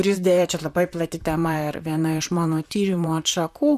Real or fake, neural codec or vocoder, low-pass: fake; vocoder, 44.1 kHz, 128 mel bands, Pupu-Vocoder; 14.4 kHz